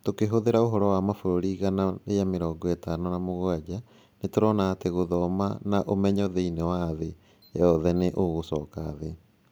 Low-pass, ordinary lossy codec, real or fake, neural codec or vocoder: none; none; real; none